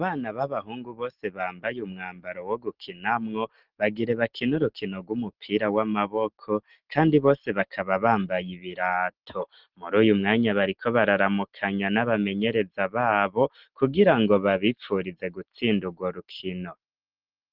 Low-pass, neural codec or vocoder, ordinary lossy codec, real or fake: 5.4 kHz; none; Opus, 16 kbps; real